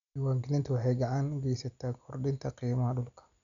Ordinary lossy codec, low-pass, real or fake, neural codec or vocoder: none; 7.2 kHz; real; none